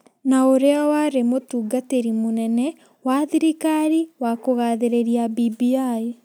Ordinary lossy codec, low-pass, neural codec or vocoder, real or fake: none; none; none; real